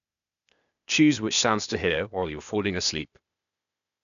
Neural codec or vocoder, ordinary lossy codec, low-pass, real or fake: codec, 16 kHz, 0.8 kbps, ZipCodec; none; 7.2 kHz; fake